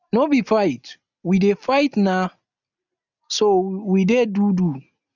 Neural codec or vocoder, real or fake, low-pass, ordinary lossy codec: none; real; 7.2 kHz; none